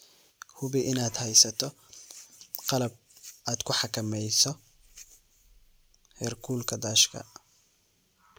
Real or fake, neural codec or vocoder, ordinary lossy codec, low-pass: real; none; none; none